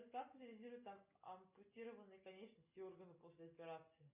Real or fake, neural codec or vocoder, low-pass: real; none; 3.6 kHz